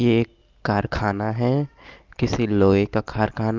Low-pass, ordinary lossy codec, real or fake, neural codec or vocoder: 7.2 kHz; Opus, 32 kbps; real; none